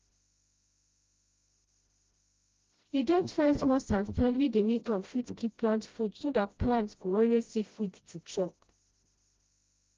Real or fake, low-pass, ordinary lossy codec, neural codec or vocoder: fake; 7.2 kHz; Opus, 32 kbps; codec, 16 kHz, 0.5 kbps, FreqCodec, smaller model